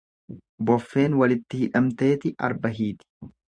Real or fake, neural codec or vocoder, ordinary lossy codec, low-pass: fake; vocoder, 24 kHz, 100 mel bands, Vocos; Opus, 64 kbps; 9.9 kHz